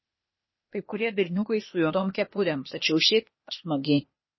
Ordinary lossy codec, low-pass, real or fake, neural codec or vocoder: MP3, 24 kbps; 7.2 kHz; fake; codec, 16 kHz, 0.8 kbps, ZipCodec